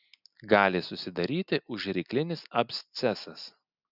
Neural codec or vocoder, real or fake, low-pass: none; real; 5.4 kHz